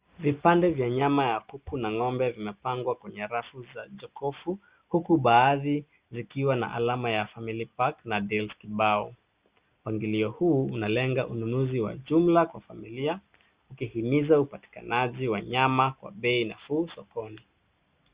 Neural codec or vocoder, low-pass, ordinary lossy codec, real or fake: none; 3.6 kHz; Opus, 64 kbps; real